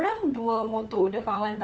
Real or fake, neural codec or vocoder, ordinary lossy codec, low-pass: fake; codec, 16 kHz, 4 kbps, FunCodec, trained on LibriTTS, 50 frames a second; none; none